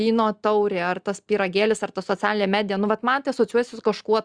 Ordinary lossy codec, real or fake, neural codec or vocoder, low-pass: Opus, 64 kbps; real; none; 9.9 kHz